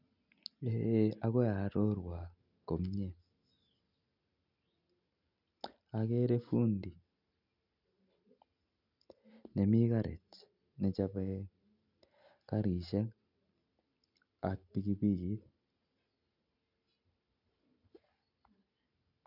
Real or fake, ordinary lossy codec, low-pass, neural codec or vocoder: real; none; 5.4 kHz; none